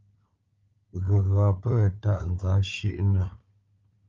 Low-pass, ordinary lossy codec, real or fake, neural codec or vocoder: 7.2 kHz; Opus, 32 kbps; fake; codec, 16 kHz, 4 kbps, FunCodec, trained on Chinese and English, 50 frames a second